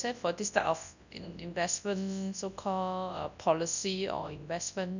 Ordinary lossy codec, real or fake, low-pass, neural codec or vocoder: none; fake; 7.2 kHz; codec, 24 kHz, 0.9 kbps, WavTokenizer, large speech release